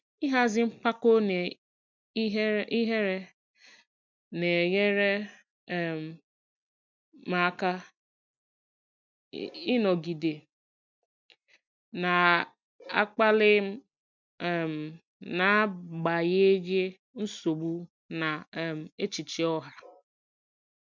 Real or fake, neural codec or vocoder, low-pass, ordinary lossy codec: real; none; 7.2 kHz; MP3, 64 kbps